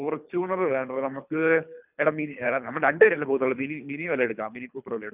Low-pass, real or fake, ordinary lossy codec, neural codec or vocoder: 3.6 kHz; fake; none; codec, 24 kHz, 3 kbps, HILCodec